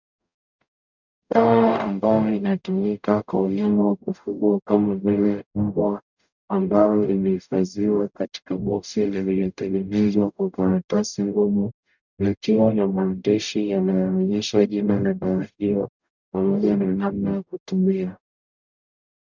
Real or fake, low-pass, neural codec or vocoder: fake; 7.2 kHz; codec, 44.1 kHz, 0.9 kbps, DAC